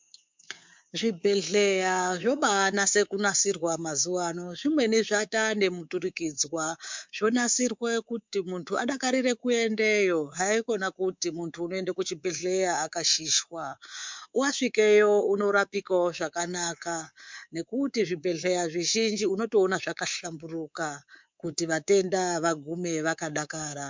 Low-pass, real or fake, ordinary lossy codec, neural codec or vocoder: 7.2 kHz; fake; MP3, 64 kbps; codec, 24 kHz, 3.1 kbps, DualCodec